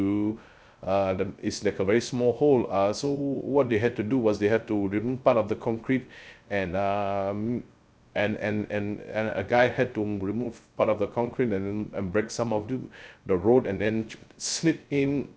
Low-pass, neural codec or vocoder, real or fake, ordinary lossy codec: none; codec, 16 kHz, 0.3 kbps, FocalCodec; fake; none